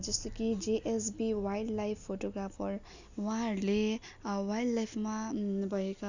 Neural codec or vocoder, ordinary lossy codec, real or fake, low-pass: none; none; real; 7.2 kHz